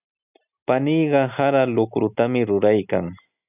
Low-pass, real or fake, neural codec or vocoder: 3.6 kHz; real; none